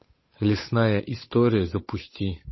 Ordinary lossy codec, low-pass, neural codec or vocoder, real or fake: MP3, 24 kbps; 7.2 kHz; codec, 16 kHz, 8 kbps, FunCodec, trained on Chinese and English, 25 frames a second; fake